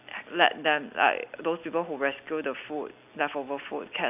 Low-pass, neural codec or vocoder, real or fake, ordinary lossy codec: 3.6 kHz; none; real; none